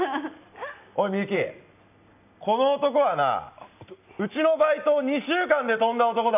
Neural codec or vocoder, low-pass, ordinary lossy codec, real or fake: none; 3.6 kHz; none; real